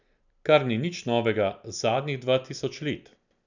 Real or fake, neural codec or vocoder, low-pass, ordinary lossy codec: real; none; 7.2 kHz; none